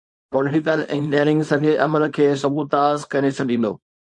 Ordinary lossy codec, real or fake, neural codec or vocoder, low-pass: MP3, 48 kbps; fake; codec, 24 kHz, 0.9 kbps, WavTokenizer, small release; 10.8 kHz